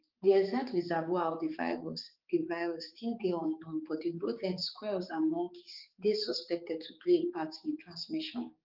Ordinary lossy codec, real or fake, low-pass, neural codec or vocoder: Opus, 32 kbps; fake; 5.4 kHz; codec, 16 kHz, 4 kbps, X-Codec, HuBERT features, trained on balanced general audio